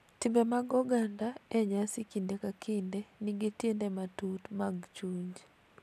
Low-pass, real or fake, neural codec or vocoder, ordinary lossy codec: 14.4 kHz; real; none; none